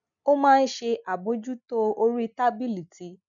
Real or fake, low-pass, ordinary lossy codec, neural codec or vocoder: real; 7.2 kHz; none; none